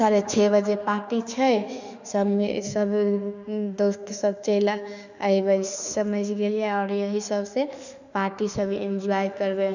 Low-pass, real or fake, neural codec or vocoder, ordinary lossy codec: 7.2 kHz; fake; autoencoder, 48 kHz, 32 numbers a frame, DAC-VAE, trained on Japanese speech; none